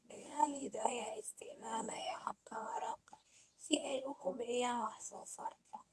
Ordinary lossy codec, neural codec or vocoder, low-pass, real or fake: none; codec, 24 kHz, 0.9 kbps, WavTokenizer, medium speech release version 1; none; fake